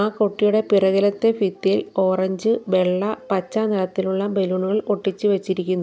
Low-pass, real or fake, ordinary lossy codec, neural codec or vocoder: none; real; none; none